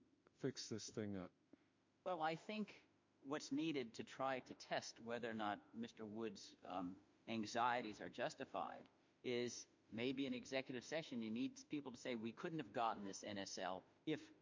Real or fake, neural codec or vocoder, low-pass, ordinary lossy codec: fake; autoencoder, 48 kHz, 32 numbers a frame, DAC-VAE, trained on Japanese speech; 7.2 kHz; MP3, 48 kbps